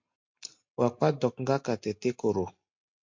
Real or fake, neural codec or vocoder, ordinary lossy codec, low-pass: real; none; MP3, 48 kbps; 7.2 kHz